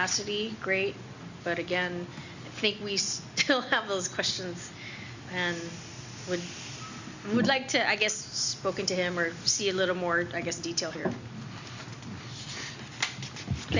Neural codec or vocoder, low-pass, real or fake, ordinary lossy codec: none; 7.2 kHz; real; Opus, 64 kbps